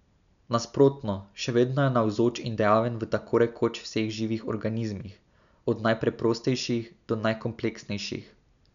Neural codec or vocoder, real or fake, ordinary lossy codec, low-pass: none; real; none; 7.2 kHz